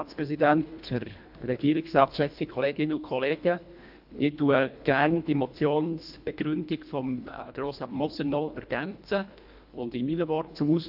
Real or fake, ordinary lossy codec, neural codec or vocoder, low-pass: fake; AAC, 48 kbps; codec, 24 kHz, 1.5 kbps, HILCodec; 5.4 kHz